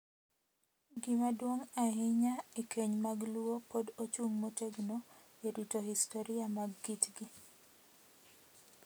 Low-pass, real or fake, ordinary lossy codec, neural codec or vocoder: none; real; none; none